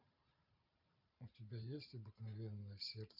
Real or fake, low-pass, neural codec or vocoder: fake; 5.4 kHz; vocoder, 44.1 kHz, 128 mel bands every 512 samples, BigVGAN v2